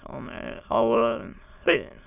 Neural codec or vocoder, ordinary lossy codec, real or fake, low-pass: autoencoder, 22.05 kHz, a latent of 192 numbers a frame, VITS, trained on many speakers; none; fake; 3.6 kHz